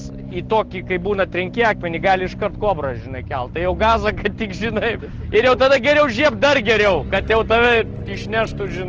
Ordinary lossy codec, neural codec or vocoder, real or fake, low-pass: Opus, 16 kbps; none; real; 7.2 kHz